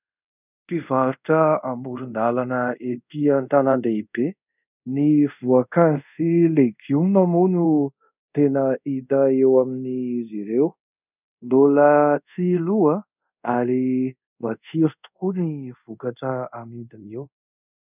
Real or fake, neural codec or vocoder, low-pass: fake; codec, 24 kHz, 0.5 kbps, DualCodec; 3.6 kHz